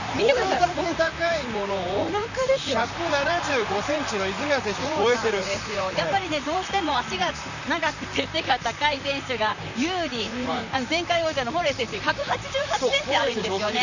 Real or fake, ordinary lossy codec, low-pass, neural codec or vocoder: fake; none; 7.2 kHz; vocoder, 44.1 kHz, 128 mel bands, Pupu-Vocoder